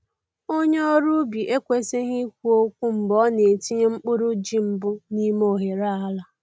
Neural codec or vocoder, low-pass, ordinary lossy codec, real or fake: none; none; none; real